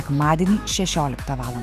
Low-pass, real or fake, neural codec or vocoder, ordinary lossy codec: 14.4 kHz; fake; autoencoder, 48 kHz, 128 numbers a frame, DAC-VAE, trained on Japanese speech; Opus, 64 kbps